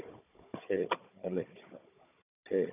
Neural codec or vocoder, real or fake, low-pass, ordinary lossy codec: codec, 16 kHz, 16 kbps, FunCodec, trained on Chinese and English, 50 frames a second; fake; 3.6 kHz; none